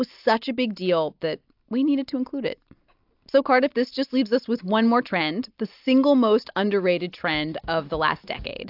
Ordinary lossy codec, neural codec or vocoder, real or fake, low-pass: AAC, 48 kbps; none; real; 5.4 kHz